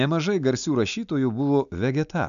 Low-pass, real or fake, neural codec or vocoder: 7.2 kHz; real; none